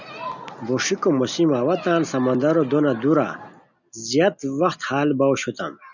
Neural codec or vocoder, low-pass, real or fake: none; 7.2 kHz; real